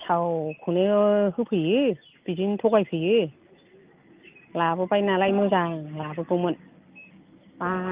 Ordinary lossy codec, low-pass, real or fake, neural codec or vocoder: Opus, 24 kbps; 3.6 kHz; real; none